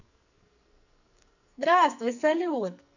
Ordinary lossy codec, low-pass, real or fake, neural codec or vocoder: none; 7.2 kHz; fake; codec, 44.1 kHz, 2.6 kbps, SNAC